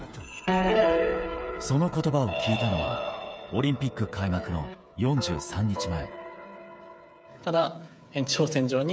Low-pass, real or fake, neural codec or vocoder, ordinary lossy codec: none; fake; codec, 16 kHz, 8 kbps, FreqCodec, smaller model; none